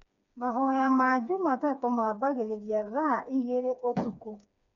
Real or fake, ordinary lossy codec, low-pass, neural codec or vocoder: fake; none; 7.2 kHz; codec, 16 kHz, 4 kbps, FreqCodec, smaller model